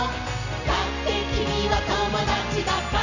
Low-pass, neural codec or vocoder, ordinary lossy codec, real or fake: 7.2 kHz; none; none; real